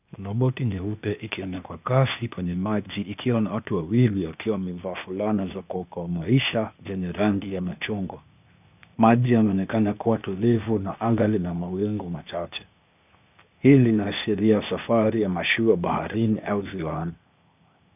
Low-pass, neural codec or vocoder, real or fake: 3.6 kHz; codec, 16 kHz, 0.8 kbps, ZipCodec; fake